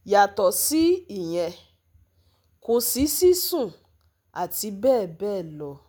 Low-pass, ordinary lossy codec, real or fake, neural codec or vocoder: none; none; real; none